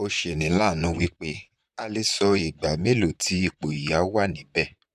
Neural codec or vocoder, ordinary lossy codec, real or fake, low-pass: vocoder, 44.1 kHz, 128 mel bands, Pupu-Vocoder; none; fake; 14.4 kHz